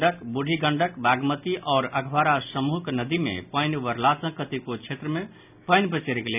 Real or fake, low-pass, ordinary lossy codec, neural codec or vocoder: real; 3.6 kHz; none; none